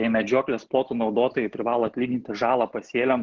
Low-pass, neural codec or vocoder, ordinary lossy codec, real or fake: 7.2 kHz; none; Opus, 16 kbps; real